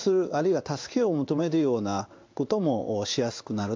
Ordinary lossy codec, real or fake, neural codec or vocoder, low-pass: MP3, 64 kbps; fake; codec, 16 kHz in and 24 kHz out, 1 kbps, XY-Tokenizer; 7.2 kHz